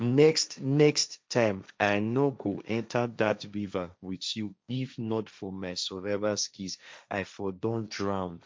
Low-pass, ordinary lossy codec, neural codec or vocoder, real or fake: none; none; codec, 16 kHz, 1.1 kbps, Voila-Tokenizer; fake